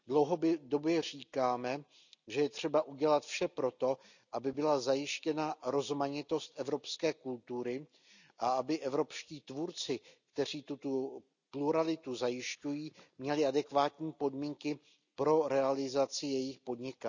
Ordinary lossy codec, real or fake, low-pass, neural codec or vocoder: none; real; 7.2 kHz; none